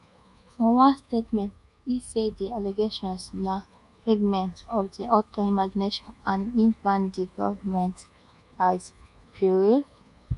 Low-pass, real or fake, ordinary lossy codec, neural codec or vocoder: 10.8 kHz; fake; none; codec, 24 kHz, 1.2 kbps, DualCodec